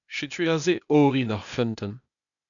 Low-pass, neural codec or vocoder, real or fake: 7.2 kHz; codec, 16 kHz, 0.8 kbps, ZipCodec; fake